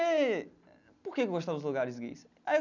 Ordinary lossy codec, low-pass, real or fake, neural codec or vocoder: none; 7.2 kHz; real; none